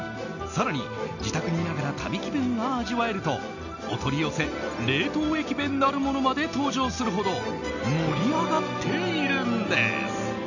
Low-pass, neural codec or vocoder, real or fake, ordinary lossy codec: 7.2 kHz; none; real; none